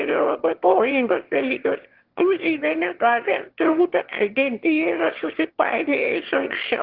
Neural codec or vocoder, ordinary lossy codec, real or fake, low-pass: autoencoder, 22.05 kHz, a latent of 192 numbers a frame, VITS, trained on one speaker; Opus, 16 kbps; fake; 5.4 kHz